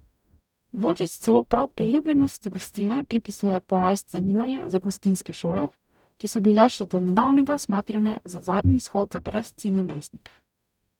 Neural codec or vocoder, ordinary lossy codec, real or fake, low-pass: codec, 44.1 kHz, 0.9 kbps, DAC; none; fake; 19.8 kHz